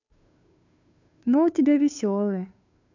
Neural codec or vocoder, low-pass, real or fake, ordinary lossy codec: codec, 16 kHz, 2 kbps, FunCodec, trained on Chinese and English, 25 frames a second; 7.2 kHz; fake; none